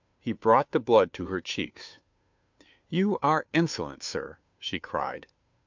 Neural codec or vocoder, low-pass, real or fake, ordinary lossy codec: codec, 16 kHz, 2 kbps, FunCodec, trained on Chinese and English, 25 frames a second; 7.2 kHz; fake; MP3, 64 kbps